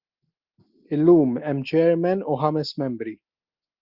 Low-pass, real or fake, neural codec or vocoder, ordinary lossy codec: 5.4 kHz; real; none; Opus, 32 kbps